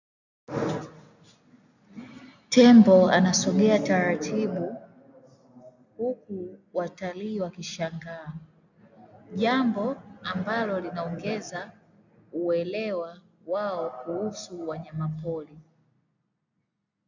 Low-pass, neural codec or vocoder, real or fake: 7.2 kHz; none; real